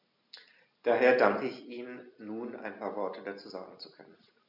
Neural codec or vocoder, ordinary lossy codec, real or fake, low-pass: none; none; real; 5.4 kHz